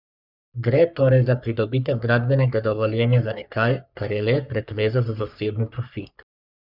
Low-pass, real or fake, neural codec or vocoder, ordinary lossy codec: 5.4 kHz; fake; codec, 44.1 kHz, 3.4 kbps, Pupu-Codec; none